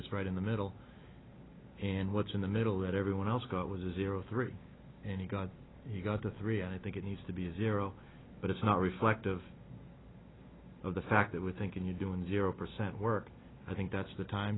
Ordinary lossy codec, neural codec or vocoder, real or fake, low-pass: AAC, 16 kbps; none; real; 7.2 kHz